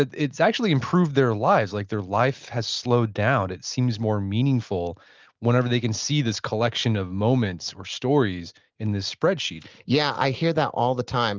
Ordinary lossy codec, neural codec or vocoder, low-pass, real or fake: Opus, 24 kbps; none; 7.2 kHz; real